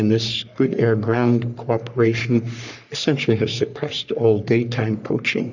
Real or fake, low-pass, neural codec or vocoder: fake; 7.2 kHz; codec, 44.1 kHz, 3.4 kbps, Pupu-Codec